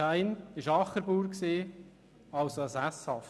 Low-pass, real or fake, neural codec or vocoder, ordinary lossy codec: none; real; none; none